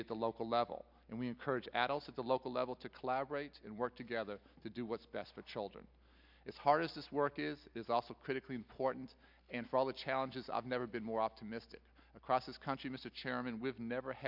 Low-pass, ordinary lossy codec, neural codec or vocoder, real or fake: 5.4 kHz; MP3, 48 kbps; none; real